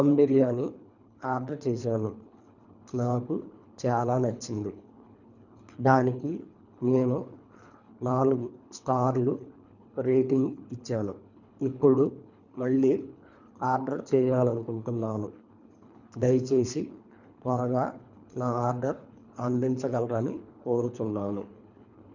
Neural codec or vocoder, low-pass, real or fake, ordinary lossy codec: codec, 24 kHz, 3 kbps, HILCodec; 7.2 kHz; fake; none